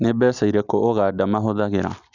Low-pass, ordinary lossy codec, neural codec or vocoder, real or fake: 7.2 kHz; none; none; real